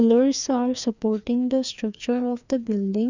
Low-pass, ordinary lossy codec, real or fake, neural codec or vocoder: 7.2 kHz; none; fake; codec, 16 kHz, 2 kbps, FreqCodec, larger model